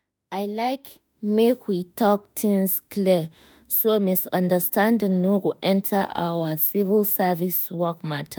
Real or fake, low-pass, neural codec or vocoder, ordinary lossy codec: fake; none; autoencoder, 48 kHz, 32 numbers a frame, DAC-VAE, trained on Japanese speech; none